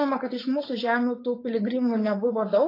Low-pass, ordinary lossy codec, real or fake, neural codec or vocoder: 5.4 kHz; AAC, 24 kbps; fake; codec, 16 kHz, 4.8 kbps, FACodec